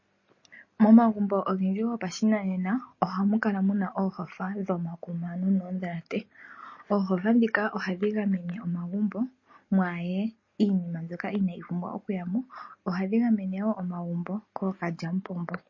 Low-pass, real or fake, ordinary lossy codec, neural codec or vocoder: 7.2 kHz; real; MP3, 32 kbps; none